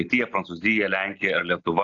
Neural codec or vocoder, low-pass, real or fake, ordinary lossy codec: none; 7.2 kHz; real; AAC, 48 kbps